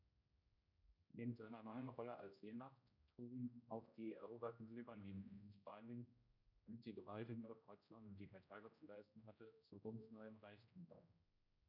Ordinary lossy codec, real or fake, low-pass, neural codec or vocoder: none; fake; 5.4 kHz; codec, 16 kHz, 0.5 kbps, X-Codec, HuBERT features, trained on general audio